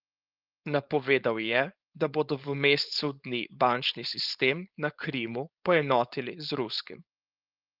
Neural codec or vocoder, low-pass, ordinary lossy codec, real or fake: codec, 16 kHz, 4.8 kbps, FACodec; 5.4 kHz; Opus, 24 kbps; fake